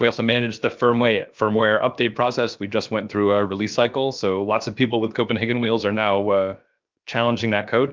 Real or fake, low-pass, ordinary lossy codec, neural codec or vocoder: fake; 7.2 kHz; Opus, 24 kbps; codec, 16 kHz, about 1 kbps, DyCAST, with the encoder's durations